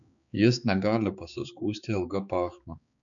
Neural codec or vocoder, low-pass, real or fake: codec, 16 kHz, 4 kbps, X-Codec, HuBERT features, trained on balanced general audio; 7.2 kHz; fake